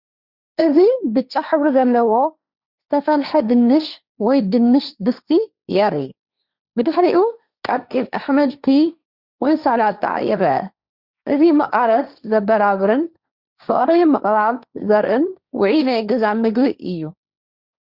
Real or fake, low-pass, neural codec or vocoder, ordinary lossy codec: fake; 5.4 kHz; codec, 16 kHz, 1.1 kbps, Voila-Tokenizer; Opus, 64 kbps